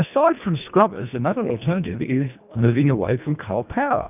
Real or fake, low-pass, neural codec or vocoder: fake; 3.6 kHz; codec, 24 kHz, 1.5 kbps, HILCodec